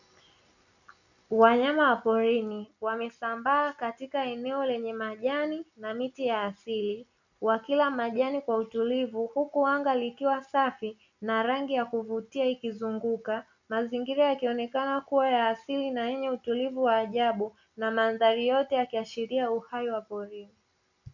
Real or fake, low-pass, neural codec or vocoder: real; 7.2 kHz; none